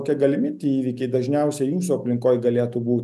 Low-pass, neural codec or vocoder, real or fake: 14.4 kHz; none; real